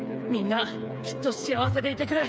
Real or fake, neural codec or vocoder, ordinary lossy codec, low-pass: fake; codec, 16 kHz, 4 kbps, FreqCodec, smaller model; none; none